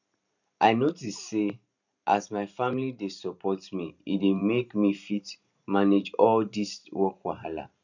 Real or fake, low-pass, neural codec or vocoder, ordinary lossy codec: fake; 7.2 kHz; vocoder, 44.1 kHz, 128 mel bands every 512 samples, BigVGAN v2; none